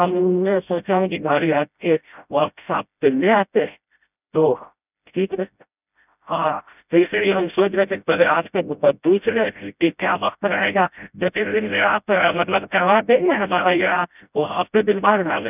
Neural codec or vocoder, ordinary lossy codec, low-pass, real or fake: codec, 16 kHz, 0.5 kbps, FreqCodec, smaller model; none; 3.6 kHz; fake